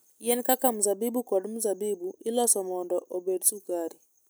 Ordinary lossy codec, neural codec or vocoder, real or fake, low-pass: none; none; real; none